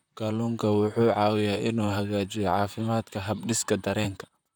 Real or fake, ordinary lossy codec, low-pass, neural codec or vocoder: fake; none; none; vocoder, 44.1 kHz, 128 mel bands, Pupu-Vocoder